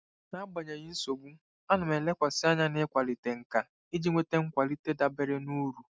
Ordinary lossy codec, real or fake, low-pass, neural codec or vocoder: none; real; none; none